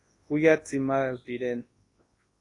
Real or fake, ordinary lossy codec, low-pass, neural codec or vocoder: fake; AAC, 32 kbps; 10.8 kHz; codec, 24 kHz, 0.9 kbps, WavTokenizer, large speech release